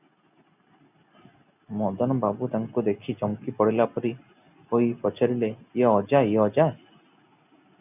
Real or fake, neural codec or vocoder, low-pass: real; none; 3.6 kHz